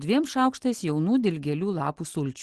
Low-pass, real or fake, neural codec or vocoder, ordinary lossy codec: 10.8 kHz; real; none; Opus, 16 kbps